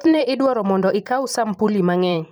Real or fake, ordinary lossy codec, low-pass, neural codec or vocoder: fake; none; none; vocoder, 44.1 kHz, 128 mel bands every 512 samples, BigVGAN v2